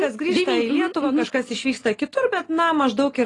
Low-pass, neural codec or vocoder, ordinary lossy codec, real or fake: 10.8 kHz; none; AAC, 32 kbps; real